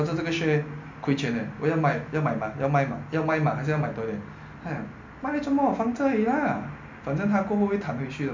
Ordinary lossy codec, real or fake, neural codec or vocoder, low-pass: MP3, 64 kbps; real; none; 7.2 kHz